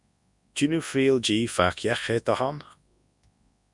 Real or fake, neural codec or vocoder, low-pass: fake; codec, 24 kHz, 0.9 kbps, WavTokenizer, large speech release; 10.8 kHz